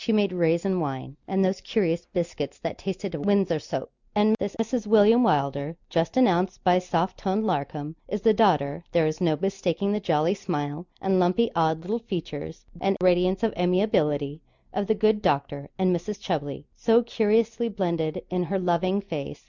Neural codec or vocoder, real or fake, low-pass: none; real; 7.2 kHz